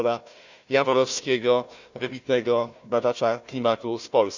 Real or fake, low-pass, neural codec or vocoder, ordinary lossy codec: fake; 7.2 kHz; codec, 16 kHz, 1 kbps, FunCodec, trained on Chinese and English, 50 frames a second; none